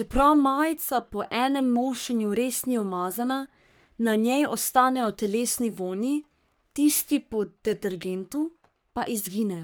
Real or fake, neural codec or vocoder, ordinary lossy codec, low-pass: fake; codec, 44.1 kHz, 3.4 kbps, Pupu-Codec; none; none